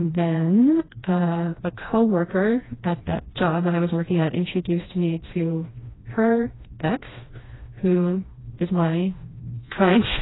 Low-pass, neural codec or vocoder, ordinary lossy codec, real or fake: 7.2 kHz; codec, 16 kHz, 1 kbps, FreqCodec, smaller model; AAC, 16 kbps; fake